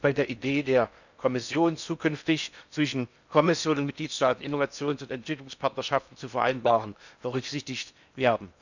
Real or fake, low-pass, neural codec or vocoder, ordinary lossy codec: fake; 7.2 kHz; codec, 16 kHz in and 24 kHz out, 0.8 kbps, FocalCodec, streaming, 65536 codes; none